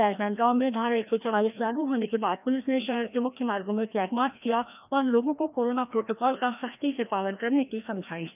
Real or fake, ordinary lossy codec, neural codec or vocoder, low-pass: fake; none; codec, 16 kHz, 1 kbps, FreqCodec, larger model; 3.6 kHz